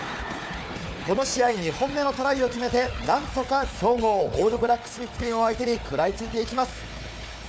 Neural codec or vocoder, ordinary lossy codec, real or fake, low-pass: codec, 16 kHz, 4 kbps, FunCodec, trained on Chinese and English, 50 frames a second; none; fake; none